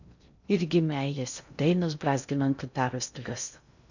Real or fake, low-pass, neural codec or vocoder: fake; 7.2 kHz; codec, 16 kHz in and 24 kHz out, 0.6 kbps, FocalCodec, streaming, 2048 codes